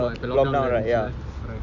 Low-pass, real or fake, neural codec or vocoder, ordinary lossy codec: 7.2 kHz; real; none; none